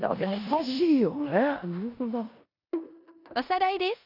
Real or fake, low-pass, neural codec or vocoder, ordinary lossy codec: fake; 5.4 kHz; codec, 16 kHz in and 24 kHz out, 0.9 kbps, LongCat-Audio-Codec, four codebook decoder; none